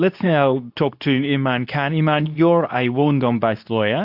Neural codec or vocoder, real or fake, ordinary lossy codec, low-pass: codec, 24 kHz, 0.9 kbps, WavTokenizer, medium speech release version 2; fake; AAC, 48 kbps; 5.4 kHz